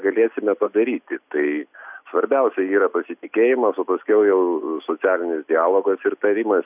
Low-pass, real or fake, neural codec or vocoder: 3.6 kHz; real; none